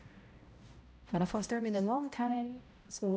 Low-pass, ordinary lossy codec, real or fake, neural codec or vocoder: none; none; fake; codec, 16 kHz, 0.5 kbps, X-Codec, HuBERT features, trained on balanced general audio